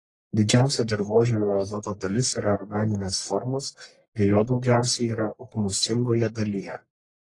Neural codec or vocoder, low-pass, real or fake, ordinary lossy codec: codec, 44.1 kHz, 1.7 kbps, Pupu-Codec; 10.8 kHz; fake; AAC, 32 kbps